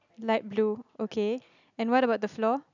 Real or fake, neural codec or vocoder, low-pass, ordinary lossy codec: real; none; 7.2 kHz; none